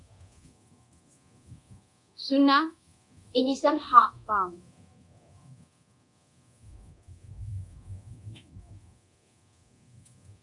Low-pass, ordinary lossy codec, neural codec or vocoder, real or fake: 10.8 kHz; AAC, 64 kbps; codec, 24 kHz, 0.9 kbps, DualCodec; fake